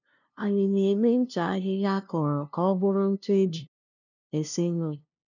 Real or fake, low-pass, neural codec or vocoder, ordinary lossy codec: fake; 7.2 kHz; codec, 16 kHz, 0.5 kbps, FunCodec, trained on LibriTTS, 25 frames a second; none